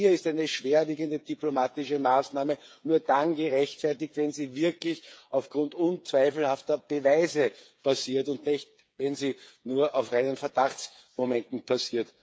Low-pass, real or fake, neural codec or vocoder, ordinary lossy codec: none; fake; codec, 16 kHz, 8 kbps, FreqCodec, smaller model; none